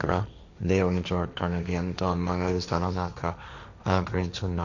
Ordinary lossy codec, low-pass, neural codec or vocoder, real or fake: none; 7.2 kHz; codec, 16 kHz, 1.1 kbps, Voila-Tokenizer; fake